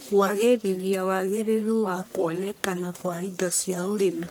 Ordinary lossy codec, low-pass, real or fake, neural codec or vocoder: none; none; fake; codec, 44.1 kHz, 1.7 kbps, Pupu-Codec